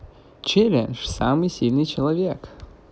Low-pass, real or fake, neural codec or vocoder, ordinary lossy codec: none; real; none; none